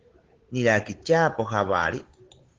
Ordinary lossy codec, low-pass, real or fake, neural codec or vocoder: Opus, 16 kbps; 7.2 kHz; fake; codec, 16 kHz, 8 kbps, FunCodec, trained on Chinese and English, 25 frames a second